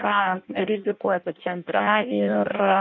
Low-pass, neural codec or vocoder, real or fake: 7.2 kHz; codec, 16 kHz in and 24 kHz out, 0.6 kbps, FireRedTTS-2 codec; fake